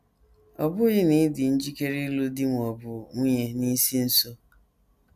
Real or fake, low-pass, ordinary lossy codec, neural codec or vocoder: real; 14.4 kHz; none; none